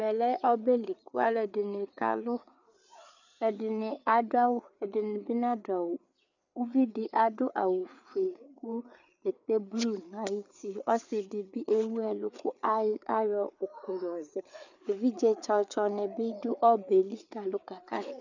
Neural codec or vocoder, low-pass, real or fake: codec, 16 kHz, 4 kbps, FreqCodec, larger model; 7.2 kHz; fake